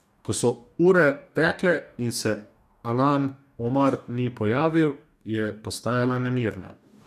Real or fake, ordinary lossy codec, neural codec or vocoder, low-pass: fake; none; codec, 44.1 kHz, 2.6 kbps, DAC; 14.4 kHz